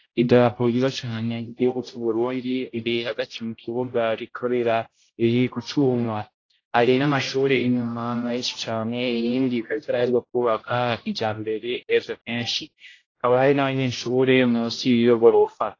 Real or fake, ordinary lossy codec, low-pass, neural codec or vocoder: fake; AAC, 32 kbps; 7.2 kHz; codec, 16 kHz, 0.5 kbps, X-Codec, HuBERT features, trained on general audio